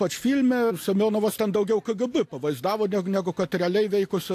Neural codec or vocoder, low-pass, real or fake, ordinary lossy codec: none; 14.4 kHz; real; AAC, 64 kbps